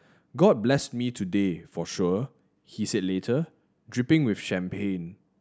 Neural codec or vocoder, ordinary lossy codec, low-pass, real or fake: none; none; none; real